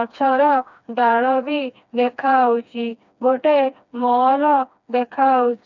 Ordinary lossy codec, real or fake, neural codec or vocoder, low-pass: none; fake; codec, 16 kHz, 2 kbps, FreqCodec, smaller model; 7.2 kHz